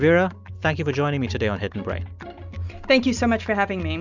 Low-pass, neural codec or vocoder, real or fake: 7.2 kHz; none; real